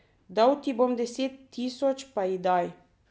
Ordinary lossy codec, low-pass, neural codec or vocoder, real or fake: none; none; none; real